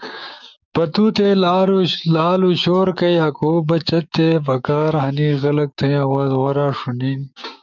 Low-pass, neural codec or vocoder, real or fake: 7.2 kHz; codec, 16 kHz, 6 kbps, DAC; fake